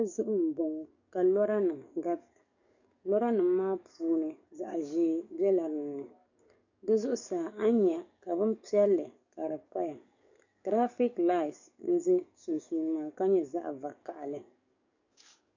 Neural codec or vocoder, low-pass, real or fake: codec, 44.1 kHz, 7.8 kbps, DAC; 7.2 kHz; fake